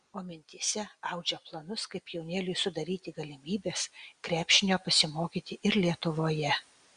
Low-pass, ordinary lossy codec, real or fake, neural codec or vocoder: 9.9 kHz; Opus, 64 kbps; real; none